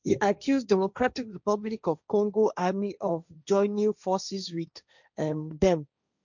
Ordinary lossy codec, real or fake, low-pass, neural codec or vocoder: none; fake; 7.2 kHz; codec, 16 kHz, 1.1 kbps, Voila-Tokenizer